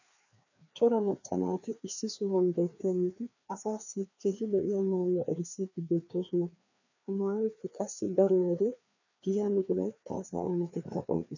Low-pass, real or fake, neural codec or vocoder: 7.2 kHz; fake; codec, 16 kHz, 2 kbps, FreqCodec, larger model